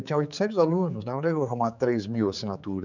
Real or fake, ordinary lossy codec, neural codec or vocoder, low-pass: fake; none; codec, 16 kHz, 4 kbps, X-Codec, HuBERT features, trained on general audio; 7.2 kHz